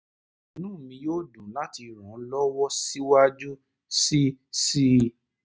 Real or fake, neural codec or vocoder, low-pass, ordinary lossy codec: real; none; none; none